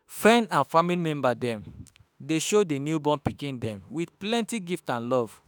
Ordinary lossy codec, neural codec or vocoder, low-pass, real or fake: none; autoencoder, 48 kHz, 32 numbers a frame, DAC-VAE, trained on Japanese speech; none; fake